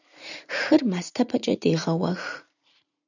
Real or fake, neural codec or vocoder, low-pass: fake; vocoder, 44.1 kHz, 80 mel bands, Vocos; 7.2 kHz